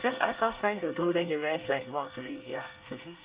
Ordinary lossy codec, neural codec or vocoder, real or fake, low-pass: Opus, 64 kbps; codec, 24 kHz, 1 kbps, SNAC; fake; 3.6 kHz